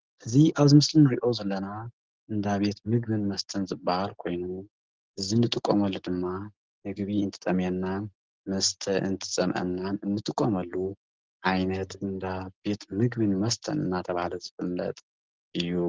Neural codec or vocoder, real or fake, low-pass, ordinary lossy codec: none; real; 7.2 kHz; Opus, 16 kbps